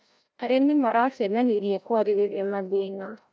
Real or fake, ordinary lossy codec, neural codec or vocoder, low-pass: fake; none; codec, 16 kHz, 0.5 kbps, FreqCodec, larger model; none